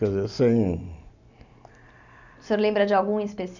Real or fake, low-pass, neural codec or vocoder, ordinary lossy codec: real; 7.2 kHz; none; none